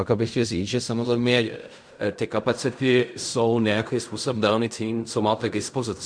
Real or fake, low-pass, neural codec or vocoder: fake; 9.9 kHz; codec, 16 kHz in and 24 kHz out, 0.4 kbps, LongCat-Audio-Codec, fine tuned four codebook decoder